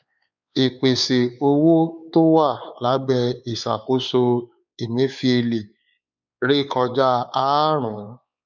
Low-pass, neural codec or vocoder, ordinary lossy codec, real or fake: 7.2 kHz; codec, 16 kHz, 4 kbps, X-Codec, WavLM features, trained on Multilingual LibriSpeech; none; fake